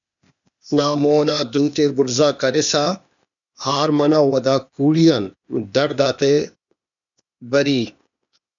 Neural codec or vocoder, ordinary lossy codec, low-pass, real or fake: codec, 16 kHz, 0.8 kbps, ZipCodec; MP3, 96 kbps; 7.2 kHz; fake